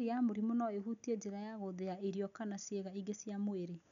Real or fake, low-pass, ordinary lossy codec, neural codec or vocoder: real; 7.2 kHz; none; none